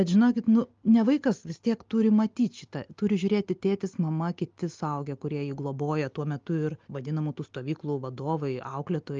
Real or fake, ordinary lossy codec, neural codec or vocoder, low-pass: real; Opus, 24 kbps; none; 7.2 kHz